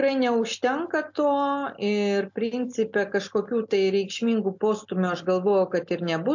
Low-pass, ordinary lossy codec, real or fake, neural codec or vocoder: 7.2 kHz; MP3, 48 kbps; real; none